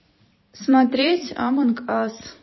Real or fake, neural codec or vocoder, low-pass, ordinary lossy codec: fake; vocoder, 44.1 kHz, 128 mel bands every 256 samples, BigVGAN v2; 7.2 kHz; MP3, 24 kbps